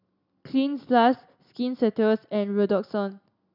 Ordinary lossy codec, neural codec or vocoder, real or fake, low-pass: none; none; real; 5.4 kHz